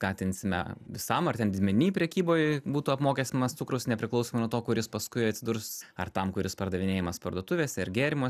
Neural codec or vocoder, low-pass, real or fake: none; 14.4 kHz; real